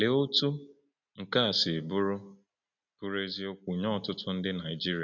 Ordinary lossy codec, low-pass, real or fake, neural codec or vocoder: none; 7.2 kHz; real; none